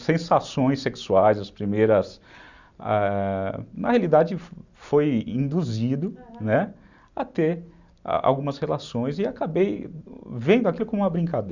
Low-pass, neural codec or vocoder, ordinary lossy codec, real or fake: 7.2 kHz; none; Opus, 64 kbps; real